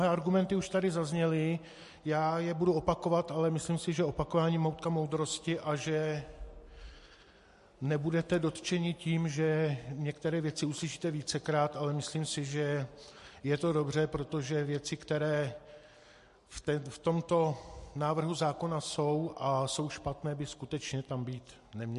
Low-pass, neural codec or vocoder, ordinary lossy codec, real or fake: 14.4 kHz; none; MP3, 48 kbps; real